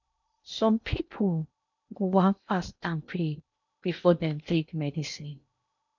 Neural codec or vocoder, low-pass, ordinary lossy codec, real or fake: codec, 16 kHz in and 24 kHz out, 0.8 kbps, FocalCodec, streaming, 65536 codes; 7.2 kHz; none; fake